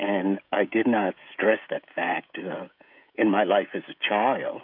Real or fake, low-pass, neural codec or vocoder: fake; 5.4 kHz; codec, 16 kHz, 16 kbps, FreqCodec, smaller model